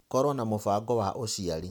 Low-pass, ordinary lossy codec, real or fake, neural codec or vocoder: none; none; real; none